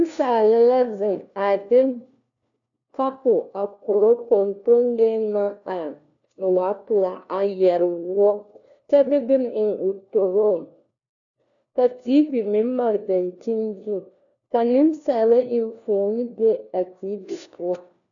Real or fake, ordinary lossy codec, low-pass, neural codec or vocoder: fake; MP3, 96 kbps; 7.2 kHz; codec, 16 kHz, 1 kbps, FunCodec, trained on LibriTTS, 50 frames a second